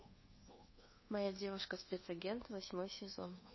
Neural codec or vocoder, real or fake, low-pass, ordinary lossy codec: codec, 24 kHz, 1.2 kbps, DualCodec; fake; 7.2 kHz; MP3, 24 kbps